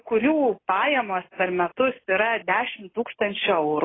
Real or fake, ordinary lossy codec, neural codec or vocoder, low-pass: real; AAC, 16 kbps; none; 7.2 kHz